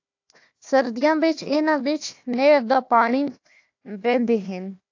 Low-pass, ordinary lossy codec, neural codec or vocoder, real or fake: 7.2 kHz; AAC, 48 kbps; codec, 16 kHz, 1 kbps, FunCodec, trained on Chinese and English, 50 frames a second; fake